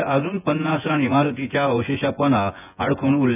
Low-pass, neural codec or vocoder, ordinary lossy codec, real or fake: 3.6 kHz; vocoder, 24 kHz, 100 mel bands, Vocos; none; fake